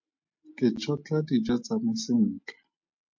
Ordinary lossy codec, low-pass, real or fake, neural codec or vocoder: MP3, 48 kbps; 7.2 kHz; real; none